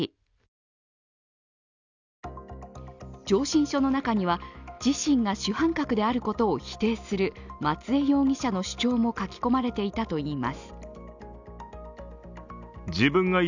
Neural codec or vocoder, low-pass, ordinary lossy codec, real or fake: none; 7.2 kHz; none; real